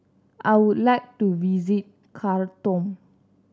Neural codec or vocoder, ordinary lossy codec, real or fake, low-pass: none; none; real; none